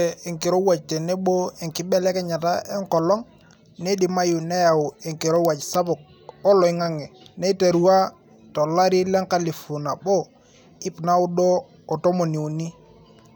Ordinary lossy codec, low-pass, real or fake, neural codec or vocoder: none; none; real; none